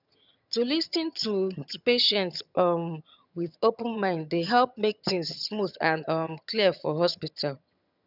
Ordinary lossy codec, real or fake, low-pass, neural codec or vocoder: none; fake; 5.4 kHz; vocoder, 22.05 kHz, 80 mel bands, HiFi-GAN